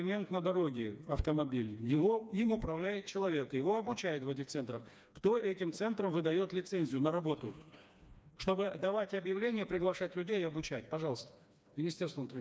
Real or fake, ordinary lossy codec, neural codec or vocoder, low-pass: fake; none; codec, 16 kHz, 2 kbps, FreqCodec, smaller model; none